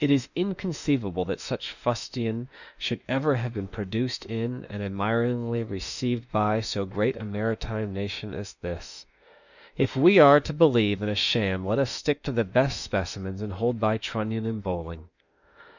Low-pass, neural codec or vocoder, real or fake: 7.2 kHz; autoencoder, 48 kHz, 32 numbers a frame, DAC-VAE, trained on Japanese speech; fake